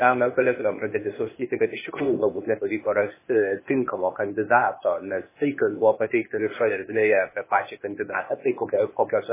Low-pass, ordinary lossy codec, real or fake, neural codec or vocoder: 3.6 kHz; MP3, 16 kbps; fake; codec, 16 kHz, 0.8 kbps, ZipCodec